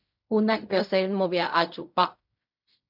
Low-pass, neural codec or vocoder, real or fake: 5.4 kHz; codec, 16 kHz in and 24 kHz out, 0.4 kbps, LongCat-Audio-Codec, fine tuned four codebook decoder; fake